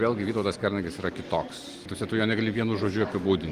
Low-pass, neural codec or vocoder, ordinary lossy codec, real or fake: 14.4 kHz; none; Opus, 24 kbps; real